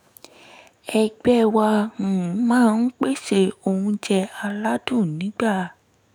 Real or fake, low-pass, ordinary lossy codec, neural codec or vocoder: fake; none; none; autoencoder, 48 kHz, 128 numbers a frame, DAC-VAE, trained on Japanese speech